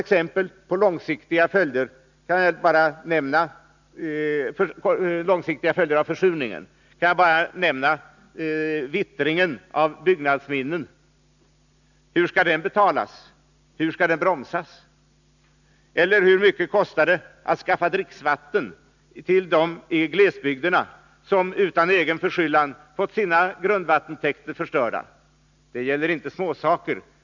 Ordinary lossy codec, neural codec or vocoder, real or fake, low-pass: none; none; real; 7.2 kHz